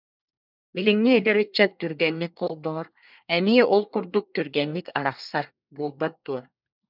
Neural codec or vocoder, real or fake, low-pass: codec, 24 kHz, 1 kbps, SNAC; fake; 5.4 kHz